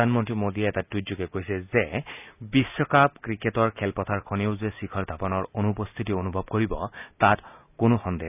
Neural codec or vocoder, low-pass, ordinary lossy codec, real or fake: none; 3.6 kHz; none; real